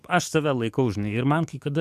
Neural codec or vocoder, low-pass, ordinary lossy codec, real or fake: codec, 44.1 kHz, 7.8 kbps, DAC; 14.4 kHz; MP3, 96 kbps; fake